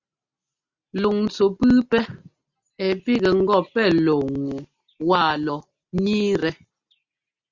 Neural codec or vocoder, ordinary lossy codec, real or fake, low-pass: none; Opus, 64 kbps; real; 7.2 kHz